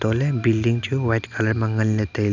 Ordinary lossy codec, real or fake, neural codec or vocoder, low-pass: none; real; none; 7.2 kHz